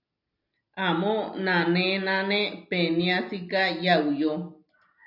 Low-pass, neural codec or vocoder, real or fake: 5.4 kHz; none; real